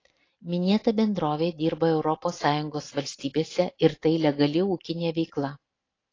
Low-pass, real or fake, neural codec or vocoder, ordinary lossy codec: 7.2 kHz; real; none; AAC, 32 kbps